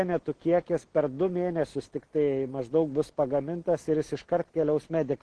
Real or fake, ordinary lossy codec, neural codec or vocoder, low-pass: real; Opus, 16 kbps; none; 10.8 kHz